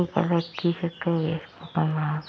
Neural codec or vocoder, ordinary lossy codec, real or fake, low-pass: none; none; real; none